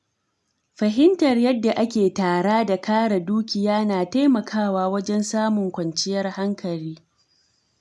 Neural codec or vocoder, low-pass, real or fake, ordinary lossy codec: none; none; real; none